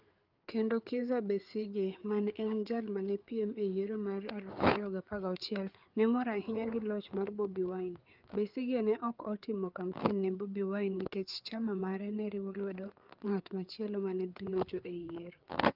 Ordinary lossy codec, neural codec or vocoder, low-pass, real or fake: Opus, 32 kbps; codec, 16 kHz, 4 kbps, FreqCodec, larger model; 5.4 kHz; fake